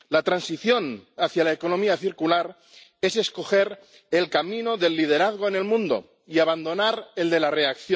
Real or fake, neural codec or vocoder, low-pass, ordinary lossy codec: real; none; none; none